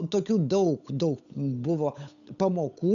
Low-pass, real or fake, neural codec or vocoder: 7.2 kHz; fake; codec, 16 kHz, 8 kbps, FunCodec, trained on Chinese and English, 25 frames a second